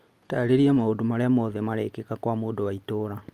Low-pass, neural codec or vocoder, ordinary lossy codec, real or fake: 14.4 kHz; none; Opus, 32 kbps; real